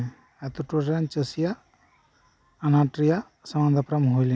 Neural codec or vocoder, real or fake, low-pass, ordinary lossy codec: none; real; none; none